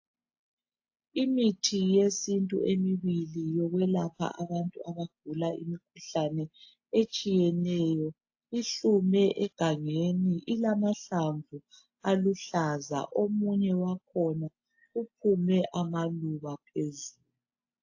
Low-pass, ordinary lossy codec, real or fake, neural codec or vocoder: 7.2 kHz; AAC, 48 kbps; real; none